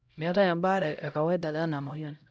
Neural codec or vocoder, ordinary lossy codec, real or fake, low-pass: codec, 16 kHz, 0.5 kbps, X-Codec, HuBERT features, trained on LibriSpeech; none; fake; none